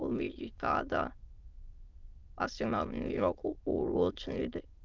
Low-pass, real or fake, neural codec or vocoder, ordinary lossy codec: 7.2 kHz; fake; autoencoder, 22.05 kHz, a latent of 192 numbers a frame, VITS, trained on many speakers; Opus, 24 kbps